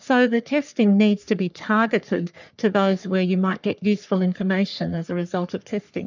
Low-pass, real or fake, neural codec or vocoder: 7.2 kHz; fake; codec, 44.1 kHz, 3.4 kbps, Pupu-Codec